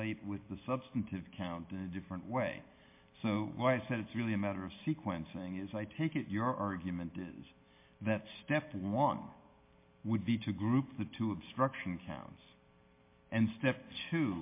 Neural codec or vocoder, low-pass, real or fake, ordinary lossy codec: none; 3.6 kHz; real; MP3, 24 kbps